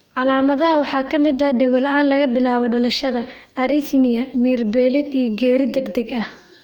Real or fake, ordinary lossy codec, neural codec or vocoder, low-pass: fake; none; codec, 44.1 kHz, 2.6 kbps, DAC; 19.8 kHz